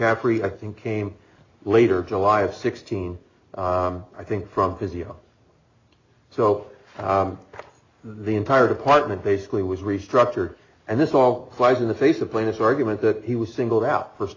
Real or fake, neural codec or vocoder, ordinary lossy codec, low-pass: real; none; AAC, 32 kbps; 7.2 kHz